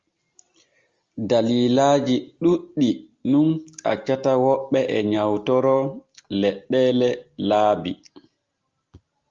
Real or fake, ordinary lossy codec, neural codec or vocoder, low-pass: real; Opus, 32 kbps; none; 7.2 kHz